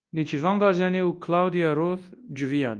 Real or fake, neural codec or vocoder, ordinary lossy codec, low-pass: fake; codec, 24 kHz, 0.9 kbps, WavTokenizer, large speech release; Opus, 24 kbps; 9.9 kHz